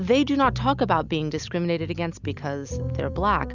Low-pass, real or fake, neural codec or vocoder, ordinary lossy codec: 7.2 kHz; real; none; Opus, 64 kbps